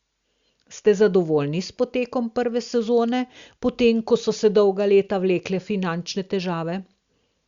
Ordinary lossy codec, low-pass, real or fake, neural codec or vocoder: Opus, 64 kbps; 7.2 kHz; real; none